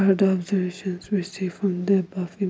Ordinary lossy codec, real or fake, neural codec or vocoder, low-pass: none; real; none; none